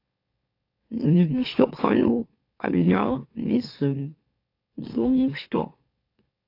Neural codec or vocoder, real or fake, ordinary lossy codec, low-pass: autoencoder, 44.1 kHz, a latent of 192 numbers a frame, MeloTTS; fake; AAC, 32 kbps; 5.4 kHz